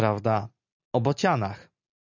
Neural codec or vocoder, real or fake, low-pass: none; real; 7.2 kHz